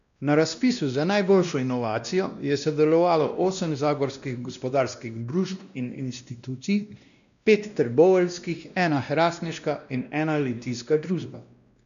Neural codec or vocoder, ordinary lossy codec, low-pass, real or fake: codec, 16 kHz, 1 kbps, X-Codec, WavLM features, trained on Multilingual LibriSpeech; none; 7.2 kHz; fake